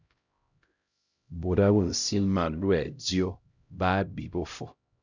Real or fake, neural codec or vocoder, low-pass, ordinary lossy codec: fake; codec, 16 kHz, 0.5 kbps, X-Codec, HuBERT features, trained on LibriSpeech; 7.2 kHz; Opus, 64 kbps